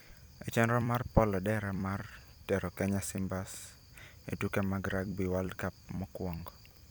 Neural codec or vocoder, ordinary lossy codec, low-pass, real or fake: vocoder, 44.1 kHz, 128 mel bands every 256 samples, BigVGAN v2; none; none; fake